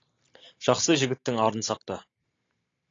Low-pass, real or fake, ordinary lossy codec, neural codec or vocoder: 7.2 kHz; real; AAC, 32 kbps; none